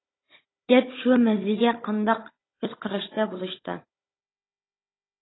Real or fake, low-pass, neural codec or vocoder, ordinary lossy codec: fake; 7.2 kHz; codec, 16 kHz, 16 kbps, FunCodec, trained on Chinese and English, 50 frames a second; AAC, 16 kbps